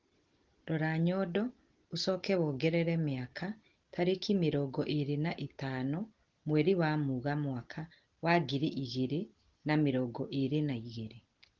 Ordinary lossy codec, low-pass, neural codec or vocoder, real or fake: Opus, 16 kbps; 7.2 kHz; none; real